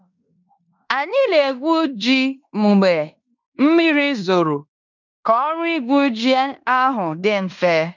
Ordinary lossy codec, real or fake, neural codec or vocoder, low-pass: none; fake; codec, 16 kHz in and 24 kHz out, 0.9 kbps, LongCat-Audio-Codec, fine tuned four codebook decoder; 7.2 kHz